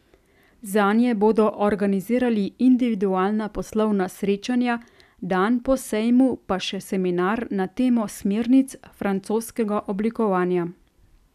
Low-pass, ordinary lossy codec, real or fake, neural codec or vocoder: 14.4 kHz; none; real; none